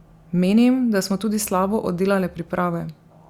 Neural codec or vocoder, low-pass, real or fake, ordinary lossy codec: none; 19.8 kHz; real; Opus, 64 kbps